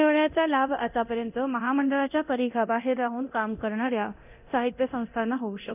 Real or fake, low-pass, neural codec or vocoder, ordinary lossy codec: fake; 3.6 kHz; codec, 24 kHz, 0.9 kbps, DualCodec; none